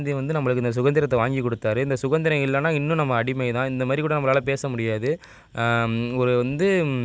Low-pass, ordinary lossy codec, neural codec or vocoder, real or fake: none; none; none; real